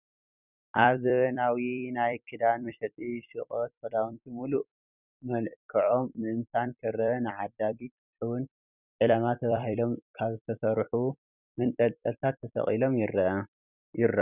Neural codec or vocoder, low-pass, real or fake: vocoder, 44.1 kHz, 128 mel bands every 256 samples, BigVGAN v2; 3.6 kHz; fake